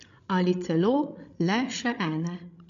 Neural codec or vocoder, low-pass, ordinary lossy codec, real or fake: codec, 16 kHz, 16 kbps, FreqCodec, larger model; 7.2 kHz; none; fake